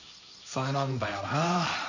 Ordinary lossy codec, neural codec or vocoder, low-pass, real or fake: none; codec, 16 kHz, 1.1 kbps, Voila-Tokenizer; 7.2 kHz; fake